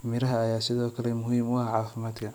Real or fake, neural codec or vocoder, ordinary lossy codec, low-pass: fake; vocoder, 44.1 kHz, 128 mel bands every 256 samples, BigVGAN v2; none; none